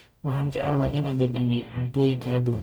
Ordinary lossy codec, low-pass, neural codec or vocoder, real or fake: none; none; codec, 44.1 kHz, 0.9 kbps, DAC; fake